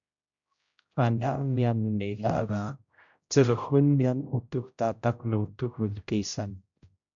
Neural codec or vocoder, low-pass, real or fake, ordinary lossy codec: codec, 16 kHz, 0.5 kbps, X-Codec, HuBERT features, trained on general audio; 7.2 kHz; fake; AAC, 64 kbps